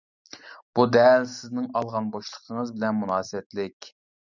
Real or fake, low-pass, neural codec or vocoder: real; 7.2 kHz; none